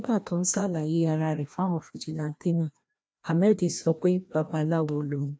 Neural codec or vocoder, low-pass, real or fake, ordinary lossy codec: codec, 16 kHz, 1 kbps, FreqCodec, larger model; none; fake; none